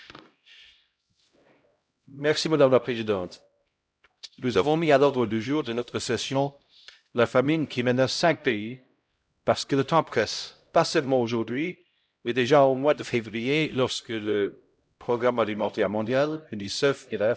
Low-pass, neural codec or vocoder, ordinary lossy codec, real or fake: none; codec, 16 kHz, 0.5 kbps, X-Codec, HuBERT features, trained on LibriSpeech; none; fake